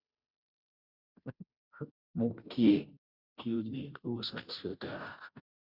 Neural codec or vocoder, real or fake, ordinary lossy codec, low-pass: codec, 16 kHz, 0.5 kbps, FunCodec, trained on Chinese and English, 25 frames a second; fake; none; 5.4 kHz